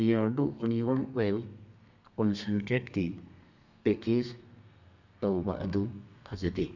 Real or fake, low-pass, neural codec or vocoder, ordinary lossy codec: fake; 7.2 kHz; codec, 32 kHz, 1.9 kbps, SNAC; none